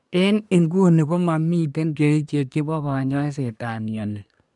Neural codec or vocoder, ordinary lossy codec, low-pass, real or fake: codec, 24 kHz, 1 kbps, SNAC; none; 10.8 kHz; fake